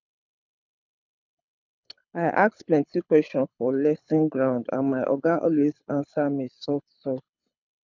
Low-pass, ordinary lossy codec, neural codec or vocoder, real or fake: 7.2 kHz; none; codec, 24 kHz, 6 kbps, HILCodec; fake